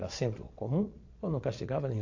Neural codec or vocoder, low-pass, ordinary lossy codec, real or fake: vocoder, 22.05 kHz, 80 mel bands, Vocos; 7.2 kHz; AAC, 48 kbps; fake